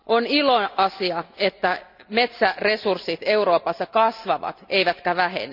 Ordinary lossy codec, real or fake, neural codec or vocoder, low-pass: none; real; none; 5.4 kHz